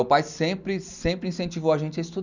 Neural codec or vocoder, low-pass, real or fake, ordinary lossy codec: none; 7.2 kHz; real; none